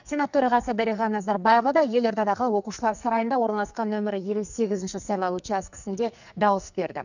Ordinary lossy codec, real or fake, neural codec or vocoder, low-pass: none; fake; codec, 44.1 kHz, 2.6 kbps, SNAC; 7.2 kHz